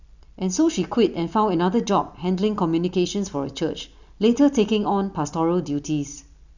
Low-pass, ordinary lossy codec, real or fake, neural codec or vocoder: 7.2 kHz; none; real; none